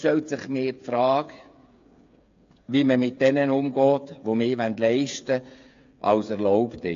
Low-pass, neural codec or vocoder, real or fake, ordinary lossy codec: 7.2 kHz; codec, 16 kHz, 8 kbps, FreqCodec, smaller model; fake; AAC, 48 kbps